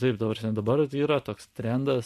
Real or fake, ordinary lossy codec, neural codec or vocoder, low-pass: real; MP3, 64 kbps; none; 14.4 kHz